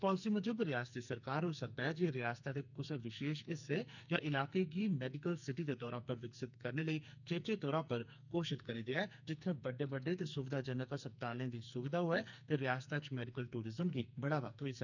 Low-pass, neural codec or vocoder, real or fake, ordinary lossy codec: 7.2 kHz; codec, 44.1 kHz, 2.6 kbps, SNAC; fake; none